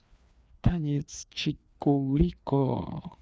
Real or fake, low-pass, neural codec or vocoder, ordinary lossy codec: fake; none; codec, 16 kHz, 2 kbps, FreqCodec, larger model; none